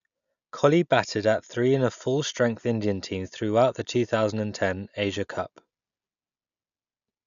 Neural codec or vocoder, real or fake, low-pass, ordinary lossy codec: none; real; 7.2 kHz; none